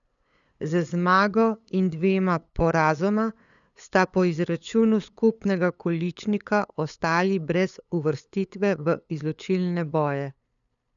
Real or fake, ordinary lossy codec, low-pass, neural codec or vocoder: fake; none; 7.2 kHz; codec, 16 kHz, 8 kbps, FunCodec, trained on LibriTTS, 25 frames a second